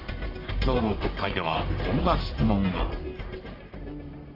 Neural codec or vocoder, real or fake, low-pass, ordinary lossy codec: codec, 44.1 kHz, 1.7 kbps, Pupu-Codec; fake; 5.4 kHz; AAC, 24 kbps